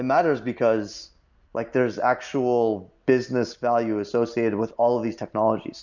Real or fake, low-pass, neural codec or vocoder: real; 7.2 kHz; none